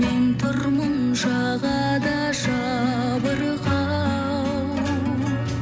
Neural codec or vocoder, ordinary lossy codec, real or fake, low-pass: none; none; real; none